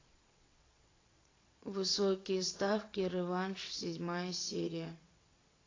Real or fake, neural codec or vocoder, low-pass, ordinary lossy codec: fake; vocoder, 22.05 kHz, 80 mel bands, WaveNeXt; 7.2 kHz; AAC, 32 kbps